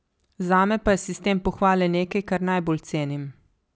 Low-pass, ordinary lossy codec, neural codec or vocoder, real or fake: none; none; none; real